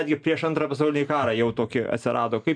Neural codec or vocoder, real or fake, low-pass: none; real; 9.9 kHz